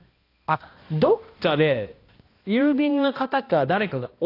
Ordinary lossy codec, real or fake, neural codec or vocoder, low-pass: AAC, 32 kbps; fake; codec, 16 kHz, 1 kbps, X-Codec, HuBERT features, trained on balanced general audio; 5.4 kHz